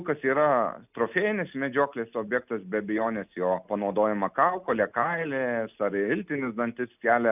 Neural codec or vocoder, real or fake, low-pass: none; real; 3.6 kHz